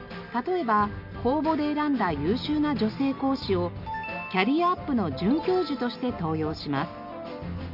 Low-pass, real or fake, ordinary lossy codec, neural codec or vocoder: 5.4 kHz; real; none; none